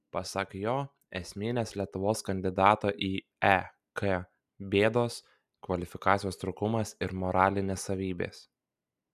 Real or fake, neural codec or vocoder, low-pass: real; none; 14.4 kHz